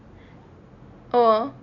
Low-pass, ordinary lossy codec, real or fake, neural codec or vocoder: 7.2 kHz; none; real; none